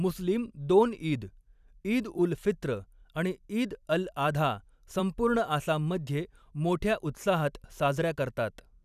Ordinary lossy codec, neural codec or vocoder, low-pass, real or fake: none; vocoder, 44.1 kHz, 128 mel bands every 256 samples, BigVGAN v2; 14.4 kHz; fake